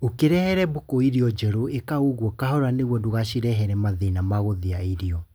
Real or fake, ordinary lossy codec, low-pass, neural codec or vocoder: real; none; none; none